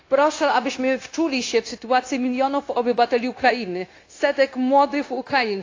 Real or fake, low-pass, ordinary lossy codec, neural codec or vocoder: fake; 7.2 kHz; AAC, 32 kbps; codec, 16 kHz, 0.9 kbps, LongCat-Audio-Codec